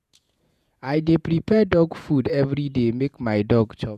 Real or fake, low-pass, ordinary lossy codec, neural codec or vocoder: fake; 14.4 kHz; none; vocoder, 48 kHz, 128 mel bands, Vocos